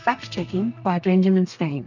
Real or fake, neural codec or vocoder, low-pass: fake; codec, 32 kHz, 1.9 kbps, SNAC; 7.2 kHz